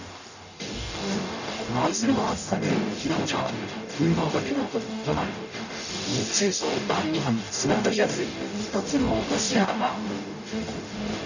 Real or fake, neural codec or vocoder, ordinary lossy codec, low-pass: fake; codec, 44.1 kHz, 0.9 kbps, DAC; none; 7.2 kHz